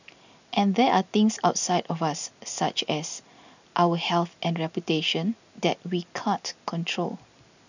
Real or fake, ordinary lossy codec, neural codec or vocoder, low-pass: real; none; none; 7.2 kHz